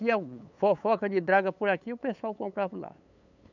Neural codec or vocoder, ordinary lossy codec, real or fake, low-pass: codec, 16 kHz, 8 kbps, FunCodec, trained on LibriTTS, 25 frames a second; none; fake; 7.2 kHz